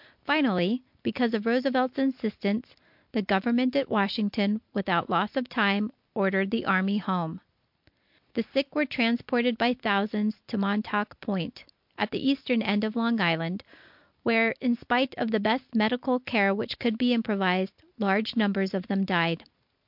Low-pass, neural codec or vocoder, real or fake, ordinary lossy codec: 5.4 kHz; none; real; MP3, 48 kbps